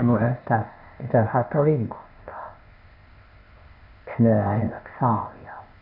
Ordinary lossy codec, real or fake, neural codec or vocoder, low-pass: none; fake; codec, 16 kHz, 0.8 kbps, ZipCodec; 5.4 kHz